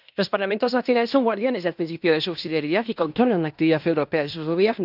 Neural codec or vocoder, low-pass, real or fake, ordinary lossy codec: codec, 16 kHz in and 24 kHz out, 0.9 kbps, LongCat-Audio-Codec, fine tuned four codebook decoder; 5.4 kHz; fake; none